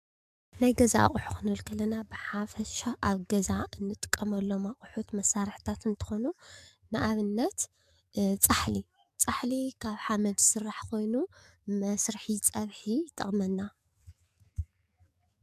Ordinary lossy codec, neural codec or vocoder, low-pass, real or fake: MP3, 96 kbps; codec, 44.1 kHz, 7.8 kbps, DAC; 14.4 kHz; fake